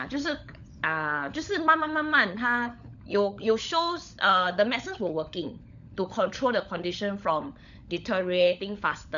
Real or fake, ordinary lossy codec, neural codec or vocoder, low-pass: fake; MP3, 96 kbps; codec, 16 kHz, 16 kbps, FunCodec, trained on LibriTTS, 50 frames a second; 7.2 kHz